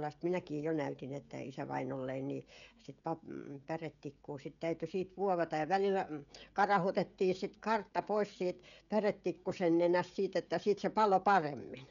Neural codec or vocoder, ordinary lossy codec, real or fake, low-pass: codec, 16 kHz, 16 kbps, FreqCodec, smaller model; none; fake; 7.2 kHz